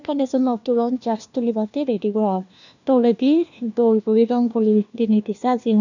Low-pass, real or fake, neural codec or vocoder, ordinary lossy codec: 7.2 kHz; fake; codec, 16 kHz, 1 kbps, FunCodec, trained on LibriTTS, 50 frames a second; none